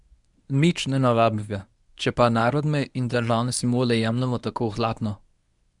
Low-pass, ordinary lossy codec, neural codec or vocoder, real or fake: 10.8 kHz; none; codec, 24 kHz, 0.9 kbps, WavTokenizer, medium speech release version 1; fake